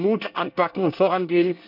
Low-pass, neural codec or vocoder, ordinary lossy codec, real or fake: 5.4 kHz; codec, 24 kHz, 1 kbps, SNAC; none; fake